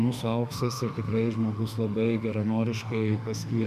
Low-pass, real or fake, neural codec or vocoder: 14.4 kHz; fake; autoencoder, 48 kHz, 32 numbers a frame, DAC-VAE, trained on Japanese speech